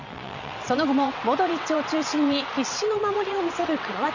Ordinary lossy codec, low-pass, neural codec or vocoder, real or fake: none; 7.2 kHz; vocoder, 22.05 kHz, 80 mel bands, WaveNeXt; fake